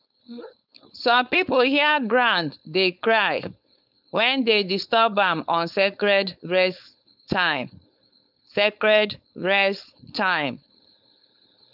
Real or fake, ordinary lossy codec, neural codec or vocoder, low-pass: fake; none; codec, 16 kHz, 4.8 kbps, FACodec; 5.4 kHz